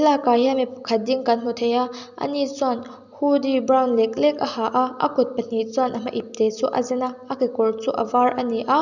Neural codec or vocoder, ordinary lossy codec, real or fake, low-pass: none; none; real; 7.2 kHz